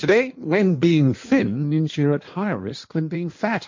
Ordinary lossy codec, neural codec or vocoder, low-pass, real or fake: MP3, 48 kbps; codec, 16 kHz in and 24 kHz out, 1.1 kbps, FireRedTTS-2 codec; 7.2 kHz; fake